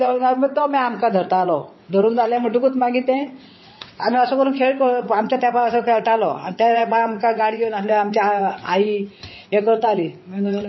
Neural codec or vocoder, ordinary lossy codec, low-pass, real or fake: vocoder, 22.05 kHz, 80 mel bands, WaveNeXt; MP3, 24 kbps; 7.2 kHz; fake